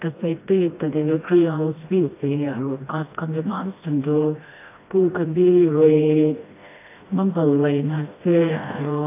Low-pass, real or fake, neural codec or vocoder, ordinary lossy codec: 3.6 kHz; fake; codec, 16 kHz, 1 kbps, FreqCodec, smaller model; AAC, 24 kbps